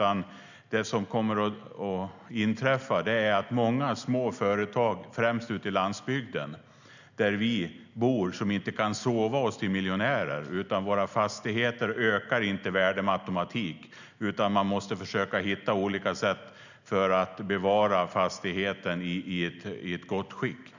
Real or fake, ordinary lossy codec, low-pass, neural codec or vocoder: real; none; 7.2 kHz; none